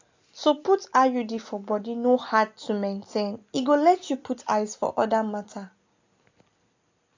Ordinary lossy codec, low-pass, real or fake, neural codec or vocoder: AAC, 48 kbps; 7.2 kHz; real; none